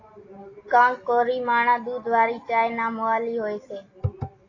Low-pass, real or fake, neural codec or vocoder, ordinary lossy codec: 7.2 kHz; real; none; AAC, 32 kbps